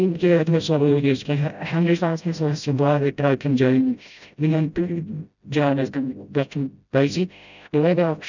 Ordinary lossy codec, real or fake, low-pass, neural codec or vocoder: none; fake; 7.2 kHz; codec, 16 kHz, 0.5 kbps, FreqCodec, smaller model